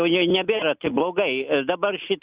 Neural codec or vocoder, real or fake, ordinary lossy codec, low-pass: none; real; Opus, 64 kbps; 3.6 kHz